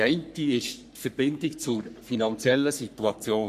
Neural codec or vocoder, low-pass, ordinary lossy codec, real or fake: codec, 44.1 kHz, 3.4 kbps, Pupu-Codec; 14.4 kHz; none; fake